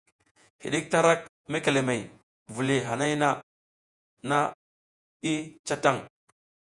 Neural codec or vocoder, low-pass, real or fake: vocoder, 48 kHz, 128 mel bands, Vocos; 10.8 kHz; fake